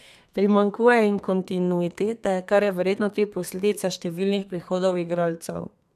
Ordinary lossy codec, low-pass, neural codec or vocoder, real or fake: none; 14.4 kHz; codec, 32 kHz, 1.9 kbps, SNAC; fake